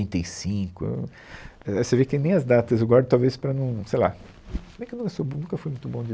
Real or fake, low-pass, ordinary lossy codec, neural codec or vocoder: real; none; none; none